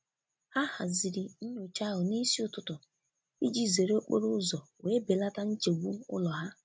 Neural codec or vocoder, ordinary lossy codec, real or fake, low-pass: none; none; real; none